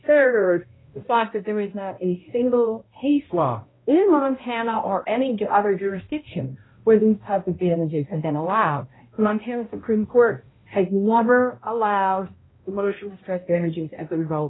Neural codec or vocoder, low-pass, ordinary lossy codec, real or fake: codec, 16 kHz, 0.5 kbps, X-Codec, HuBERT features, trained on balanced general audio; 7.2 kHz; AAC, 16 kbps; fake